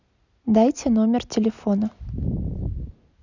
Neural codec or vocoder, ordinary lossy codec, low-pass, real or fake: none; none; 7.2 kHz; real